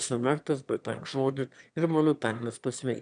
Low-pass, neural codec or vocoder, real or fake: 9.9 kHz; autoencoder, 22.05 kHz, a latent of 192 numbers a frame, VITS, trained on one speaker; fake